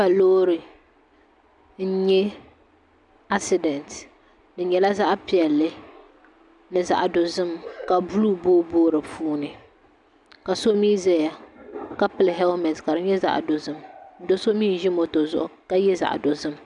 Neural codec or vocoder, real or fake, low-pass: none; real; 10.8 kHz